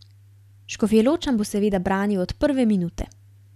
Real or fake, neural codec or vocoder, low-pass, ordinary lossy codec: real; none; 14.4 kHz; none